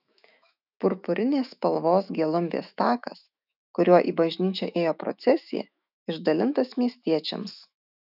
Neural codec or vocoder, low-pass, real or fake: autoencoder, 48 kHz, 128 numbers a frame, DAC-VAE, trained on Japanese speech; 5.4 kHz; fake